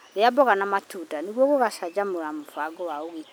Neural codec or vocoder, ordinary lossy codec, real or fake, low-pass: none; none; real; none